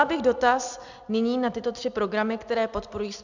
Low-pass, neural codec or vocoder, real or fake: 7.2 kHz; none; real